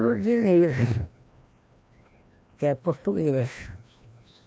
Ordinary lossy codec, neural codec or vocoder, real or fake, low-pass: none; codec, 16 kHz, 1 kbps, FreqCodec, larger model; fake; none